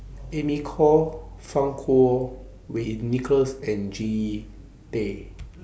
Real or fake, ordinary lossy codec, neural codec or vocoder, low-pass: real; none; none; none